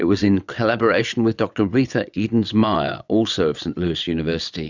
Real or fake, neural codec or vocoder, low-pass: fake; vocoder, 22.05 kHz, 80 mel bands, WaveNeXt; 7.2 kHz